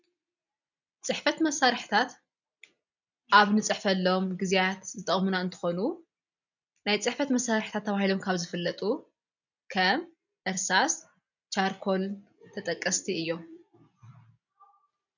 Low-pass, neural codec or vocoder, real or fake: 7.2 kHz; none; real